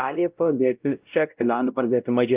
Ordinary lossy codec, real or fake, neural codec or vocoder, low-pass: Opus, 32 kbps; fake; codec, 16 kHz, 0.5 kbps, X-Codec, WavLM features, trained on Multilingual LibriSpeech; 3.6 kHz